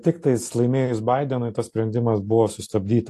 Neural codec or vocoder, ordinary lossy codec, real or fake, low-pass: none; AAC, 48 kbps; real; 14.4 kHz